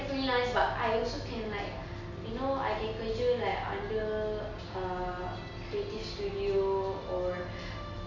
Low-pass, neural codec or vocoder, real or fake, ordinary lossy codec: 7.2 kHz; none; real; none